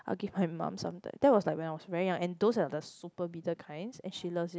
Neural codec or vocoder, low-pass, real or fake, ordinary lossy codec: none; none; real; none